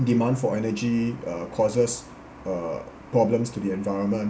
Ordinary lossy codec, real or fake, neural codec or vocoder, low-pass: none; real; none; none